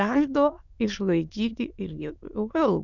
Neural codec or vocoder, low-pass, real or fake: autoencoder, 22.05 kHz, a latent of 192 numbers a frame, VITS, trained on many speakers; 7.2 kHz; fake